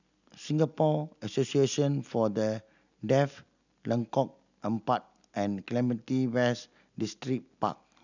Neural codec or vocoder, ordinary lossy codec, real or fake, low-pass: none; none; real; 7.2 kHz